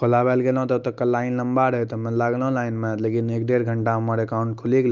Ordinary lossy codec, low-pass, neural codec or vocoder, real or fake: Opus, 32 kbps; 7.2 kHz; none; real